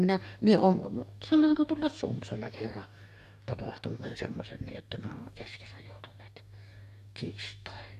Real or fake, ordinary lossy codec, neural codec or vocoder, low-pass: fake; none; codec, 44.1 kHz, 2.6 kbps, DAC; 14.4 kHz